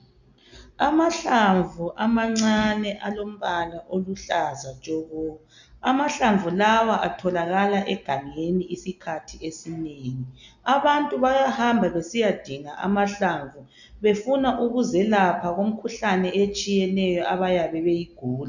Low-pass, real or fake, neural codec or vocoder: 7.2 kHz; real; none